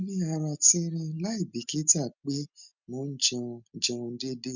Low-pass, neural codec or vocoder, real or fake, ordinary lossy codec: 7.2 kHz; none; real; none